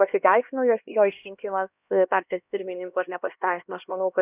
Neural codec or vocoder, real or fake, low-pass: codec, 16 kHz, 1 kbps, X-Codec, HuBERT features, trained on LibriSpeech; fake; 3.6 kHz